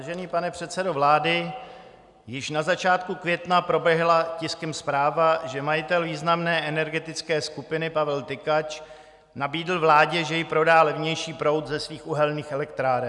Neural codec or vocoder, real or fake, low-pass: none; real; 10.8 kHz